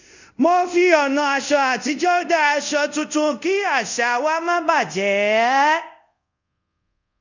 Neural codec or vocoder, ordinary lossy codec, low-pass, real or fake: codec, 24 kHz, 0.5 kbps, DualCodec; none; 7.2 kHz; fake